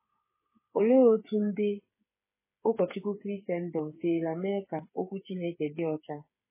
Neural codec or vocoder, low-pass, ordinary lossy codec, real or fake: codec, 16 kHz, 8 kbps, FreqCodec, smaller model; 3.6 kHz; MP3, 16 kbps; fake